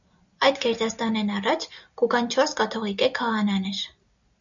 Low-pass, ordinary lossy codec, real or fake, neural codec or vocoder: 7.2 kHz; MP3, 96 kbps; real; none